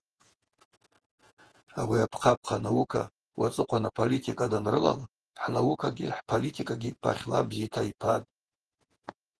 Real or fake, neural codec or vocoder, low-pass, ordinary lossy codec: fake; vocoder, 48 kHz, 128 mel bands, Vocos; 10.8 kHz; Opus, 16 kbps